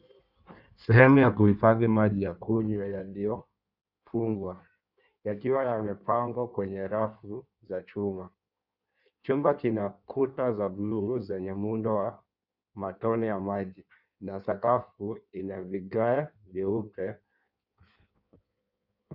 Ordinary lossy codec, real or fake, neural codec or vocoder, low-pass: Opus, 64 kbps; fake; codec, 16 kHz in and 24 kHz out, 1.1 kbps, FireRedTTS-2 codec; 5.4 kHz